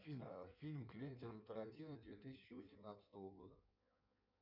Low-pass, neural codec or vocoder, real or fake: 5.4 kHz; codec, 16 kHz in and 24 kHz out, 1.1 kbps, FireRedTTS-2 codec; fake